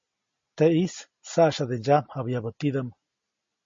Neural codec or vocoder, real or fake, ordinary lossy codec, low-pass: none; real; MP3, 32 kbps; 7.2 kHz